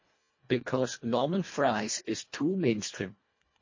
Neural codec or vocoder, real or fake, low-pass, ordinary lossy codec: codec, 24 kHz, 1.5 kbps, HILCodec; fake; 7.2 kHz; MP3, 32 kbps